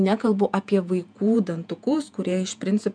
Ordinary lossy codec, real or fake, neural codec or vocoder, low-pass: MP3, 96 kbps; fake; vocoder, 48 kHz, 128 mel bands, Vocos; 9.9 kHz